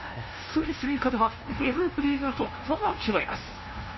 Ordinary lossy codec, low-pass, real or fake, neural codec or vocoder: MP3, 24 kbps; 7.2 kHz; fake; codec, 16 kHz, 0.5 kbps, FunCodec, trained on LibriTTS, 25 frames a second